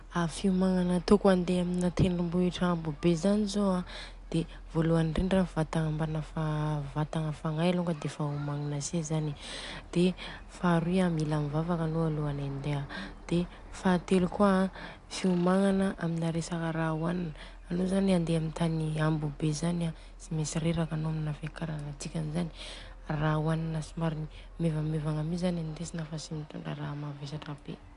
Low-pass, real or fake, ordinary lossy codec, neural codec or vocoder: 10.8 kHz; real; AAC, 64 kbps; none